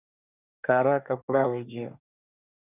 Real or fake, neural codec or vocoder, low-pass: fake; codec, 24 kHz, 1 kbps, SNAC; 3.6 kHz